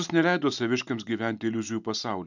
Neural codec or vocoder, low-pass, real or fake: none; 7.2 kHz; real